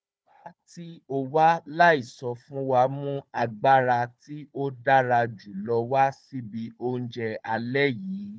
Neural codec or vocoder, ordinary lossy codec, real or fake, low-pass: codec, 16 kHz, 4 kbps, FunCodec, trained on Chinese and English, 50 frames a second; none; fake; none